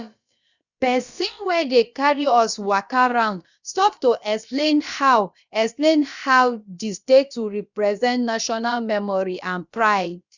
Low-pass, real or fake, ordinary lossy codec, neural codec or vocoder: 7.2 kHz; fake; Opus, 64 kbps; codec, 16 kHz, about 1 kbps, DyCAST, with the encoder's durations